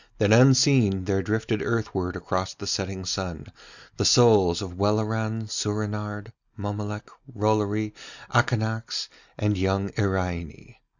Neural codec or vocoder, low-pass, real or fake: none; 7.2 kHz; real